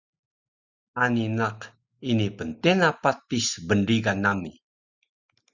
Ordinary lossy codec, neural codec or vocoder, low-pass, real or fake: Opus, 64 kbps; none; 7.2 kHz; real